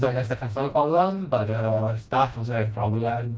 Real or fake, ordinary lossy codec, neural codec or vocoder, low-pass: fake; none; codec, 16 kHz, 1 kbps, FreqCodec, smaller model; none